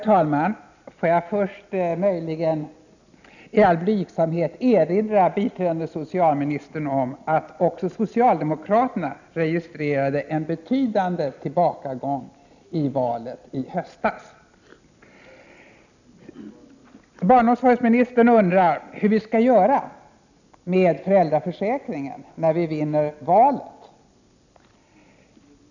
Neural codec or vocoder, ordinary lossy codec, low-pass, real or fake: none; none; 7.2 kHz; real